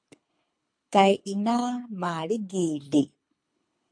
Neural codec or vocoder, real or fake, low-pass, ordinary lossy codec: codec, 24 kHz, 3 kbps, HILCodec; fake; 9.9 kHz; MP3, 48 kbps